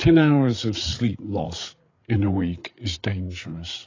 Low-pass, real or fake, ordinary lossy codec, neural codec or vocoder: 7.2 kHz; fake; AAC, 32 kbps; codec, 16 kHz, 8 kbps, FreqCodec, larger model